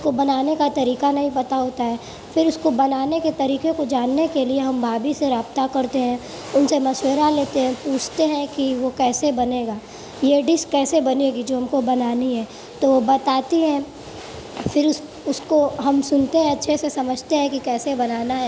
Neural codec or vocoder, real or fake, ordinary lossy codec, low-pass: none; real; none; none